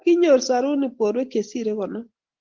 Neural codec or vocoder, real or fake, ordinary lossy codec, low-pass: none; real; Opus, 16 kbps; 7.2 kHz